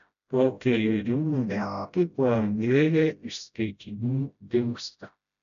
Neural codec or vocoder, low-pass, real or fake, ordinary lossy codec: codec, 16 kHz, 0.5 kbps, FreqCodec, smaller model; 7.2 kHz; fake; AAC, 96 kbps